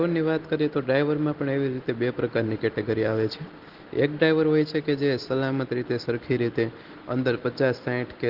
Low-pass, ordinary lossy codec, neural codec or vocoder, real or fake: 5.4 kHz; Opus, 16 kbps; none; real